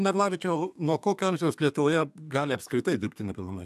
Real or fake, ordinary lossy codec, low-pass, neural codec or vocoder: fake; AAC, 96 kbps; 14.4 kHz; codec, 32 kHz, 1.9 kbps, SNAC